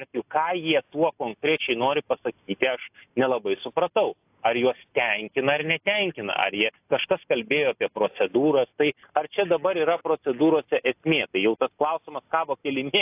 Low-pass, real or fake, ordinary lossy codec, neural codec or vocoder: 3.6 kHz; real; AAC, 32 kbps; none